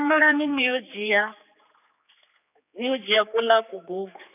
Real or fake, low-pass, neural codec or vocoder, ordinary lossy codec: fake; 3.6 kHz; codec, 16 kHz, 4 kbps, X-Codec, HuBERT features, trained on general audio; none